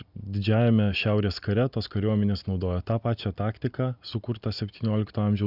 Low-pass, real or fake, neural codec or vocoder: 5.4 kHz; real; none